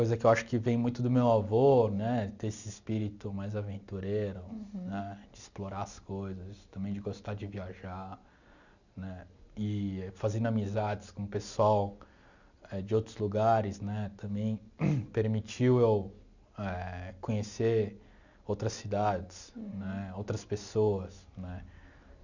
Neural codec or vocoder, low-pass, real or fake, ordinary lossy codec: none; 7.2 kHz; real; none